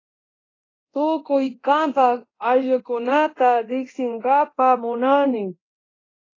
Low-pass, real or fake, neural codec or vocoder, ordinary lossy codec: 7.2 kHz; fake; codec, 24 kHz, 0.9 kbps, DualCodec; AAC, 32 kbps